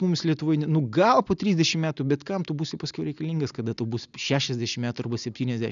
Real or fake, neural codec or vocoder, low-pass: real; none; 7.2 kHz